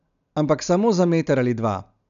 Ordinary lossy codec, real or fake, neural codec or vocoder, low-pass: none; real; none; 7.2 kHz